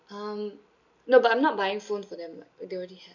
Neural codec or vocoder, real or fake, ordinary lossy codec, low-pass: none; real; none; 7.2 kHz